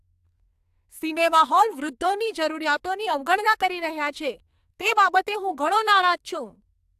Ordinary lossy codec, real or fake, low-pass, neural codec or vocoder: AAC, 96 kbps; fake; 14.4 kHz; codec, 44.1 kHz, 2.6 kbps, SNAC